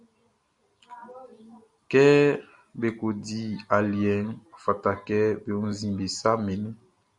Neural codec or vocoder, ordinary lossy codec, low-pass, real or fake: none; Opus, 64 kbps; 10.8 kHz; real